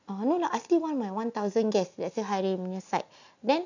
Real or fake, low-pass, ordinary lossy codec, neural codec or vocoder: real; 7.2 kHz; none; none